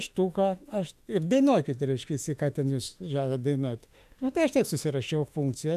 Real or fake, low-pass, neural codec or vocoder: fake; 14.4 kHz; autoencoder, 48 kHz, 32 numbers a frame, DAC-VAE, trained on Japanese speech